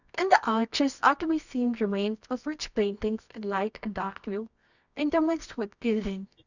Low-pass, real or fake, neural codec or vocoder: 7.2 kHz; fake; codec, 24 kHz, 0.9 kbps, WavTokenizer, medium music audio release